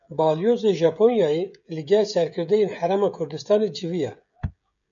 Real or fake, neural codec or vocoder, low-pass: fake; codec, 16 kHz, 16 kbps, FreqCodec, smaller model; 7.2 kHz